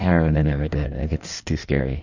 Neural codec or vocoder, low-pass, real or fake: codec, 16 kHz in and 24 kHz out, 1.1 kbps, FireRedTTS-2 codec; 7.2 kHz; fake